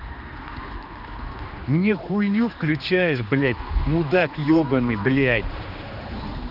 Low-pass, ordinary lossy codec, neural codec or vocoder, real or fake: 5.4 kHz; none; codec, 16 kHz, 2 kbps, X-Codec, HuBERT features, trained on general audio; fake